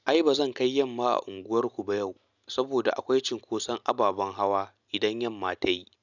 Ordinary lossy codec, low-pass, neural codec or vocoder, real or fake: none; 7.2 kHz; none; real